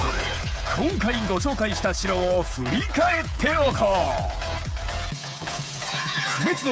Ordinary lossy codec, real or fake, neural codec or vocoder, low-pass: none; fake; codec, 16 kHz, 8 kbps, FreqCodec, smaller model; none